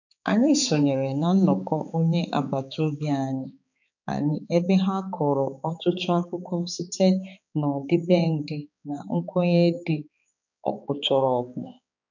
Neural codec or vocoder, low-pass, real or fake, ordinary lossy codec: codec, 16 kHz, 4 kbps, X-Codec, HuBERT features, trained on balanced general audio; 7.2 kHz; fake; none